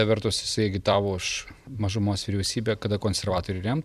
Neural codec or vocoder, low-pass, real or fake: vocoder, 44.1 kHz, 128 mel bands every 256 samples, BigVGAN v2; 14.4 kHz; fake